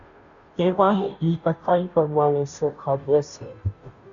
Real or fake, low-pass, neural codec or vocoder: fake; 7.2 kHz; codec, 16 kHz, 0.5 kbps, FunCodec, trained on Chinese and English, 25 frames a second